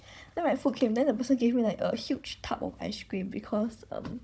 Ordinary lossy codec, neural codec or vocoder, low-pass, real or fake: none; codec, 16 kHz, 16 kbps, FunCodec, trained on Chinese and English, 50 frames a second; none; fake